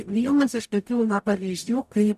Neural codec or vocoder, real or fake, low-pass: codec, 44.1 kHz, 0.9 kbps, DAC; fake; 14.4 kHz